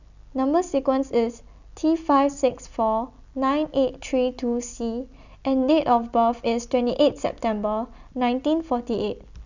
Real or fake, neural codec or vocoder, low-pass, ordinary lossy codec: real; none; 7.2 kHz; none